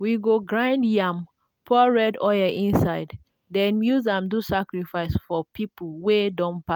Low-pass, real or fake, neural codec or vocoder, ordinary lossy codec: 19.8 kHz; real; none; none